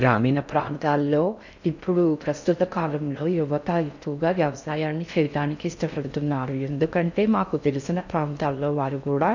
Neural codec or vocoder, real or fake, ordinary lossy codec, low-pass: codec, 16 kHz in and 24 kHz out, 0.6 kbps, FocalCodec, streaming, 4096 codes; fake; none; 7.2 kHz